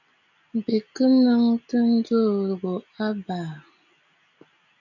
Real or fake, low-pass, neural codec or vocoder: real; 7.2 kHz; none